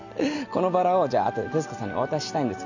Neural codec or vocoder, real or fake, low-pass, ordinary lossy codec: none; real; 7.2 kHz; none